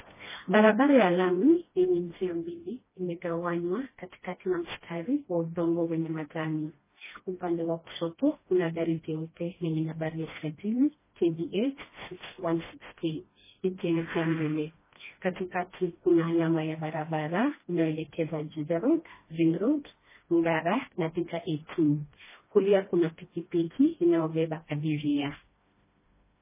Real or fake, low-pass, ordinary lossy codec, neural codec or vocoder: fake; 3.6 kHz; MP3, 16 kbps; codec, 16 kHz, 1 kbps, FreqCodec, smaller model